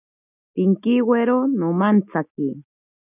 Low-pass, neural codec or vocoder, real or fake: 3.6 kHz; none; real